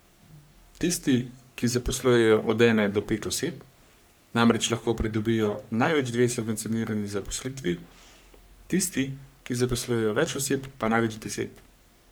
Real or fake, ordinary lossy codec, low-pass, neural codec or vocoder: fake; none; none; codec, 44.1 kHz, 3.4 kbps, Pupu-Codec